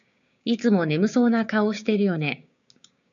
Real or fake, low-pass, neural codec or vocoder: fake; 7.2 kHz; codec, 16 kHz, 6 kbps, DAC